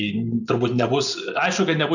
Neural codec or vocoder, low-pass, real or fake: none; 7.2 kHz; real